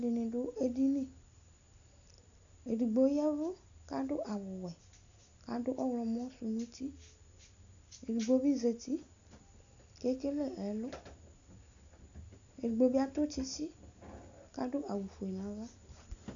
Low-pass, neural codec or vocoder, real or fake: 7.2 kHz; none; real